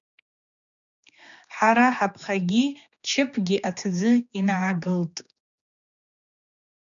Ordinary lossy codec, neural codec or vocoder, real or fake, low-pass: MP3, 96 kbps; codec, 16 kHz, 2 kbps, X-Codec, HuBERT features, trained on general audio; fake; 7.2 kHz